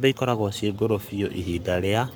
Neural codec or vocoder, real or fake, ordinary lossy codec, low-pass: codec, 44.1 kHz, 7.8 kbps, Pupu-Codec; fake; none; none